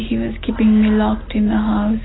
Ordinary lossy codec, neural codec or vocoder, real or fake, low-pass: AAC, 16 kbps; none; real; 7.2 kHz